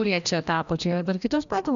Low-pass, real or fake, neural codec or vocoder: 7.2 kHz; fake; codec, 16 kHz, 1 kbps, FreqCodec, larger model